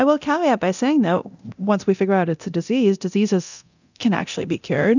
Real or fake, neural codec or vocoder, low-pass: fake; codec, 24 kHz, 0.9 kbps, DualCodec; 7.2 kHz